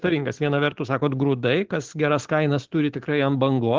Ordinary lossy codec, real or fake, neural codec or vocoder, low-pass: Opus, 16 kbps; real; none; 7.2 kHz